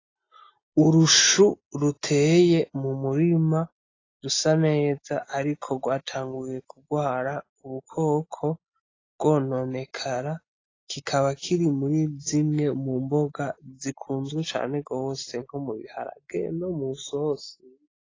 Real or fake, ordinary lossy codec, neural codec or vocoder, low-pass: real; AAC, 32 kbps; none; 7.2 kHz